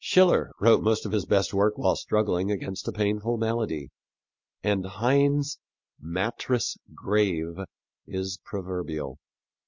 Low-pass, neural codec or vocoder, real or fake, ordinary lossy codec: 7.2 kHz; none; real; MP3, 48 kbps